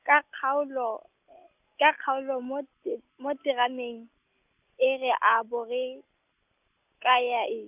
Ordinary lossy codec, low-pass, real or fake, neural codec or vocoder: none; 3.6 kHz; real; none